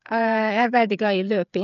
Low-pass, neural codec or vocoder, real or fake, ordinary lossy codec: 7.2 kHz; codec, 16 kHz, 8 kbps, FreqCodec, smaller model; fake; none